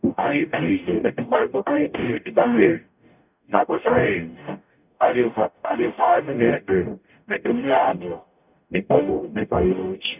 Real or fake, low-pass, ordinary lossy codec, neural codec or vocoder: fake; 3.6 kHz; none; codec, 44.1 kHz, 0.9 kbps, DAC